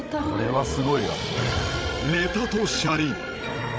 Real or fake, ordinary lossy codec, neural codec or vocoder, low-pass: fake; none; codec, 16 kHz, 16 kbps, FreqCodec, larger model; none